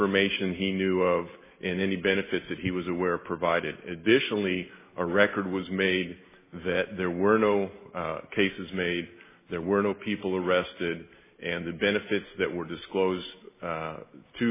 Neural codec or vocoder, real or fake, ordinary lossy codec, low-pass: none; real; MP3, 16 kbps; 3.6 kHz